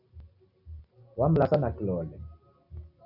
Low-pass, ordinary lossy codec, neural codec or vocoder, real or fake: 5.4 kHz; AAC, 48 kbps; none; real